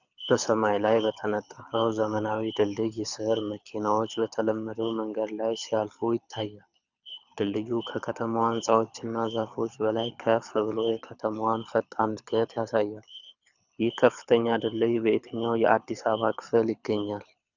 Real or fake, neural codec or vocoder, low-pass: fake; codec, 24 kHz, 6 kbps, HILCodec; 7.2 kHz